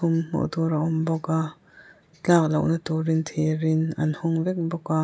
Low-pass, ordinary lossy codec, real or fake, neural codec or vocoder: none; none; real; none